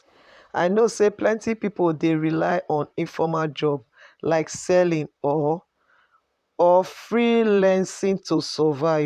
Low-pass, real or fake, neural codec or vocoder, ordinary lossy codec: 14.4 kHz; fake; vocoder, 44.1 kHz, 128 mel bands, Pupu-Vocoder; none